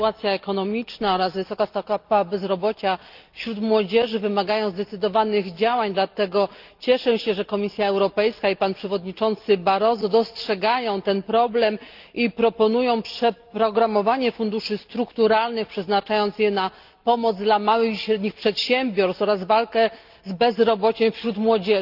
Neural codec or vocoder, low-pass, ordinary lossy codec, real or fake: none; 5.4 kHz; Opus, 32 kbps; real